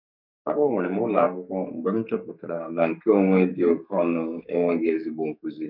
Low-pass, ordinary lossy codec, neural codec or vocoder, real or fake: 5.4 kHz; none; codec, 44.1 kHz, 2.6 kbps, SNAC; fake